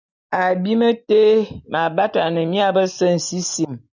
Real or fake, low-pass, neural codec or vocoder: real; 7.2 kHz; none